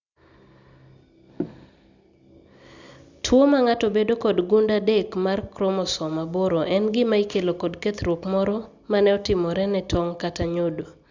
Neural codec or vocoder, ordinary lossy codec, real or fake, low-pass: none; Opus, 64 kbps; real; 7.2 kHz